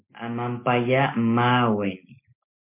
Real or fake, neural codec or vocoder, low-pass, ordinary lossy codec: real; none; 3.6 kHz; MP3, 24 kbps